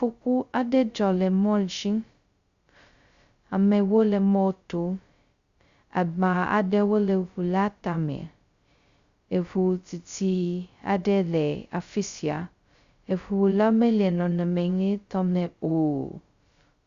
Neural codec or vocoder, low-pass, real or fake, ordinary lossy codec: codec, 16 kHz, 0.2 kbps, FocalCodec; 7.2 kHz; fake; Opus, 64 kbps